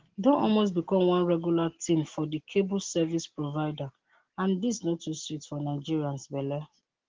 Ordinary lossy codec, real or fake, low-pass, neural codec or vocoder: Opus, 16 kbps; real; 7.2 kHz; none